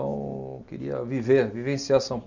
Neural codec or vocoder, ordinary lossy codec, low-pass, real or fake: none; none; 7.2 kHz; real